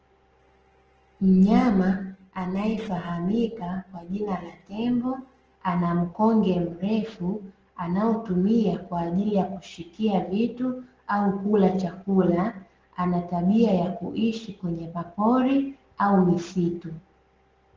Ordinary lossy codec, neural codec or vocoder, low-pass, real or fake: Opus, 16 kbps; none; 7.2 kHz; real